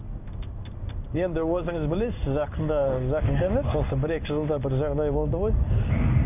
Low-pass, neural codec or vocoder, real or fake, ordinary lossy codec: 3.6 kHz; codec, 16 kHz in and 24 kHz out, 1 kbps, XY-Tokenizer; fake; none